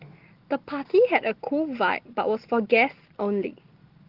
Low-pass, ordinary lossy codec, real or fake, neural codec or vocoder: 5.4 kHz; Opus, 16 kbps; real; none